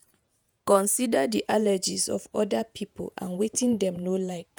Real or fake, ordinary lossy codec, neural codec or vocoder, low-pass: fake; none; vocoder, 48 kHz, 128 mel bands, Vocos; none